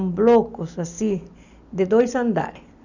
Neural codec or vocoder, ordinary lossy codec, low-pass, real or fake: none; none; 7.2 kHz; real